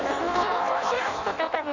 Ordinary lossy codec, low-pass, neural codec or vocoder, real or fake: none; 7.2 kHz; codec, 16 kHz in and 24 kHz out, 0.6 kbps, FireRedTTS-2 codec; fake